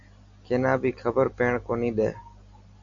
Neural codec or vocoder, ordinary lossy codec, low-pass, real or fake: none; AAC, 64 kbps; 7.2 kHz; real